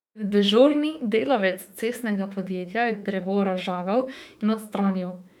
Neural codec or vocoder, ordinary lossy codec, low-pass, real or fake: autoencoder, 48 kHz, 32 numbers a frame, DAC-VAE, trained on Japanese speech; none; 19.8 kHz; fake